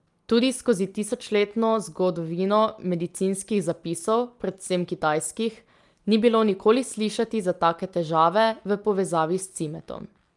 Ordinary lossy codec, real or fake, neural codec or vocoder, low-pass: Opus, 32 kbps; real; none; 10.8 kHz